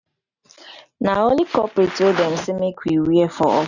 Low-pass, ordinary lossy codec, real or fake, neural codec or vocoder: 7.2 kHz; none; real; none